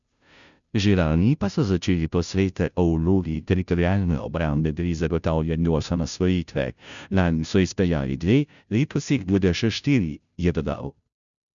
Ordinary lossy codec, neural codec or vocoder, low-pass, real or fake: none; codec, 16 kHz, 0.5 kbps, FunCodec, trained on Chinese and English, 25 frames a second; 7.2 kHz; fake